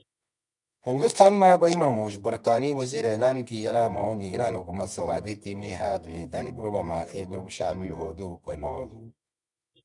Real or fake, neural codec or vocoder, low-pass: fake; codec, 24 kHz, 0.9 kbps, WavTokenizer, medium music audio release; 10.8 kHz